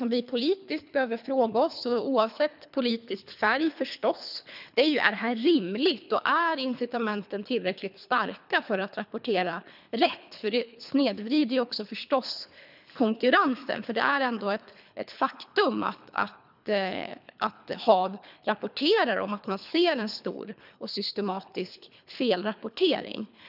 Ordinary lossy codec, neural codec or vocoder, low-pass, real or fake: none; codec, 24 kHz, 3 kbps, HILCodec; 5.4 kHz; fake